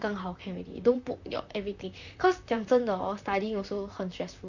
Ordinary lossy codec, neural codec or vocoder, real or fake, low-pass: none; vocoder, 44.1 kHz, 128 mel bands, Pupu-Vocoder; fake; 7.2 kHz